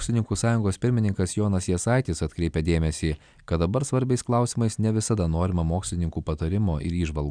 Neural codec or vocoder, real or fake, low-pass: none; real; 9.9 kHz